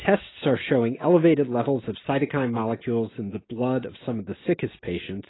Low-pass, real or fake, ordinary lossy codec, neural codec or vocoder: 7.2 kHz; real; AAC, 16 kbps; none